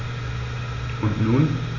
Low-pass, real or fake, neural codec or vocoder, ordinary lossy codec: 7.2 kHz; real; none; Opus, 64 kbps